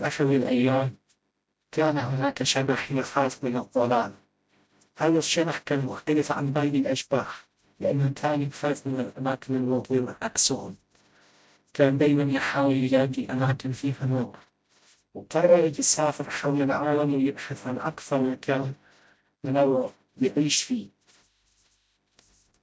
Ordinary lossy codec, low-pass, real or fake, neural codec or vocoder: none; none; fake; codec, 16 kHz, 0.5 kbps, FreqCodec, smaller model